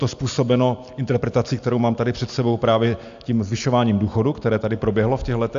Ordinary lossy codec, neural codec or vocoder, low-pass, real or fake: AAC, 64 kbps; none; 7.2 kHz; real